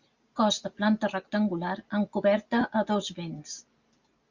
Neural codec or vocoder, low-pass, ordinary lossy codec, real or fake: none; 7.2 kHz; Opus, 64 kbps; real